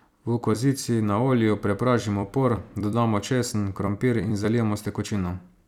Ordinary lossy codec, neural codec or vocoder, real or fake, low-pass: none; vocoder, 44.1 kHz, 128 mel bands every 256 samples, BigVGAN v2; fake; 19.8 kHz